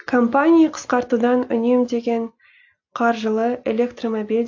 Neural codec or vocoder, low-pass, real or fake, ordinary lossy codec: none; 7.2 kHz; real; AAC, 48 kbps